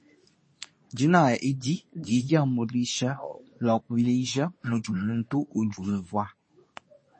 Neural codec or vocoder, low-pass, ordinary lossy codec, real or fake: codec, 24 kHz, 0.9 kbps, WavTokenizer, medium speech release version 2; 9.9 kHz; MP3, 32 kbps; fake